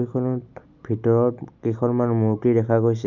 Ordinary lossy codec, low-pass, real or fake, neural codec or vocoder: none; 7.2 kHz; real; none